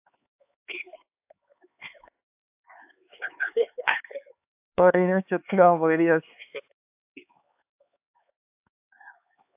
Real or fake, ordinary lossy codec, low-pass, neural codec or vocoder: fake; AAC, 32 kbps; 3.6 kHz; codec, 16 kHz, 4 kbps, X-Codec, HuBERT features, trained on LibriSpeech